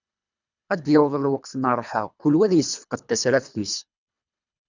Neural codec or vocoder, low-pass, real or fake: codec, 24 kHz, 3 kbps, HILCodec; 7.2 kHz; fake